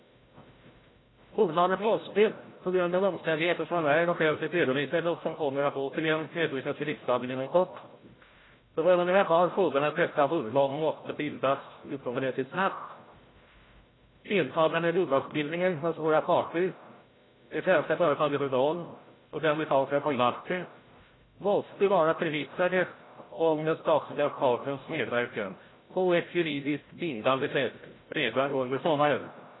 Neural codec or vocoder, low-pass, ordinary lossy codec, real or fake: codec, 16 kHz, 0.5 kbps, FreqCodec, larger model; 7.2 kHz; AAC, 16 kbps; fake